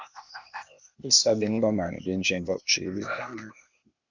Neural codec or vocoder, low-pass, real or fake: codec, 16 kHz, 0.8 kbps, ZipCodec; 7.2 kHz; fake